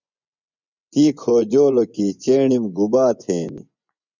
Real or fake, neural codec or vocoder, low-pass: fake; vocoder, 24 kHz, 100 mel bands, Vocos; 7.2 kHz